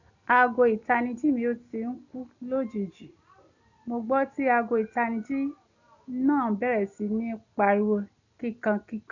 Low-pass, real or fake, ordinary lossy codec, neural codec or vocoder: 7.2 kHz; real; none; none